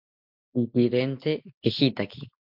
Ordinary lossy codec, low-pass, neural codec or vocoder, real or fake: MP3, 48 kbps; 5.4 kHz; none; real